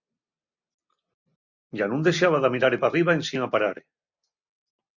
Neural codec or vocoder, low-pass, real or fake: none; 7.2 kHz; real